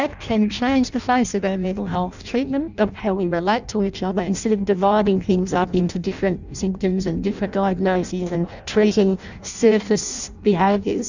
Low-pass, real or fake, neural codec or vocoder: 7.2 kHz; fake; codec, 16 kHz in and 24 kHz out, 0.6 kbps, FireRedTTS-2 codec